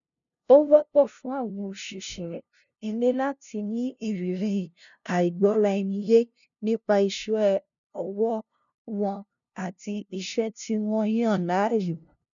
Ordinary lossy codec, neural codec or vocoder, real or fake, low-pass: none; codec, 16 kHz, 0.5 kbps, FunCodec, trained on LibriTTS, 25 frames a second; fake; 7.2 kHz